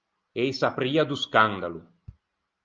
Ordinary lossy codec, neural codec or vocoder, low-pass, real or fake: Opus, 24 kbps; none; 7.2 kHz; real